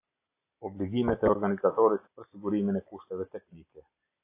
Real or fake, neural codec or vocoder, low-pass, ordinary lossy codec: real; none; 3.6 kHz; AAC, 24 kbps